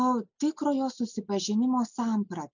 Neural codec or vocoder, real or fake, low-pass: none; real; 7.2 kHz